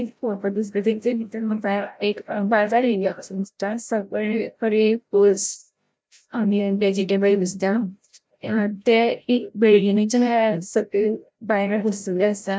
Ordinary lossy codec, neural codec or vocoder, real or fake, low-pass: none; codec, 16 kHz, 0.5 kbps, FreqCodec, larger model; fake; none